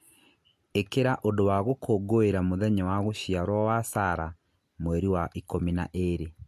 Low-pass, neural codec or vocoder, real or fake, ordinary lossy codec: 14.4 kHz; none; real; MP3, 64 kbps